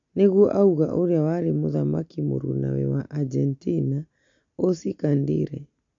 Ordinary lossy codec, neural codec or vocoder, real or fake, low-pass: MP3, 48 kbps; none; real; 7.2 kHz